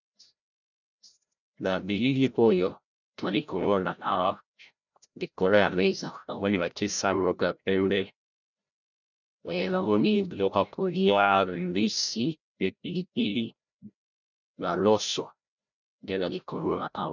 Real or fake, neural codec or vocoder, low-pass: fake; codec, 16 kHz, 0.5 kbps, FreqCodec, larger model; 7.2 kHz